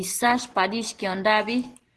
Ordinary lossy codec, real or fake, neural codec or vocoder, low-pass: Opus, 16 kbps; real; none; 10.8 kHz